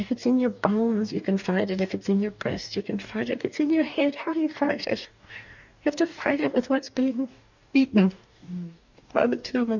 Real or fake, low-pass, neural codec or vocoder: fake; 7.2 kHz; codec, 44.1 kHz, 2.6 kbps, DAC